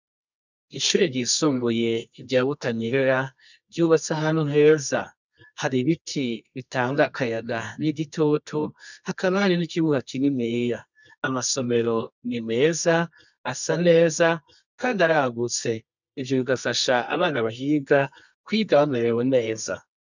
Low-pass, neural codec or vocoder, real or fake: 7.2 kHz; codec, 24 kHz, 0.9 kbps, WavTokenizer, medium music audio release; fake